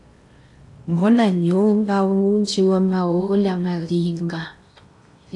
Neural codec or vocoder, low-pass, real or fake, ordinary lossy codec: codec, 16 kHz in and 24 kHz out, 0.6 kbps, FocalCodec, streaming, 4096 codes; 10.8 kHz; fake; AAC, 64 kbps